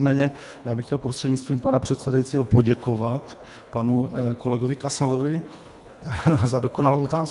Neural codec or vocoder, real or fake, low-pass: codec, 24 kHz, 1.5 kbps, HILCodec; fake; 10.8 kHz